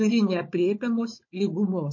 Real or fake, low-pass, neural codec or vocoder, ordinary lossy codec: fake; 7.2 kHz; codec, 16 kHz, 4 kbps, FunCodec, trained on Chinese and English, 50 frames a second; MP3, 32 kbps